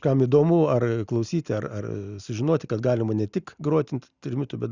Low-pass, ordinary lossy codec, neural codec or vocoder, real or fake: 7.2 kHz; Opus, 64 kbps; none; real